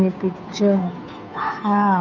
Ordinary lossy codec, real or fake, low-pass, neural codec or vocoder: none; fake; 7.2 kHz; codec, 16 kHz, 2 kbps, FunCodec, trained on Chinese and English, 25 frames a second